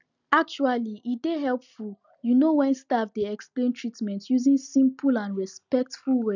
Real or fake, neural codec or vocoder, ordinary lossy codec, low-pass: real; none; none; 7.2 kHz